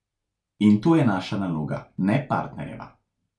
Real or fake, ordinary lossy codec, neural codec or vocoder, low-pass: real; none; none; none